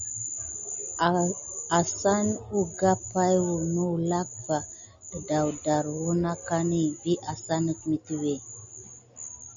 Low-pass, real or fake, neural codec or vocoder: 7.2 kHz; real; none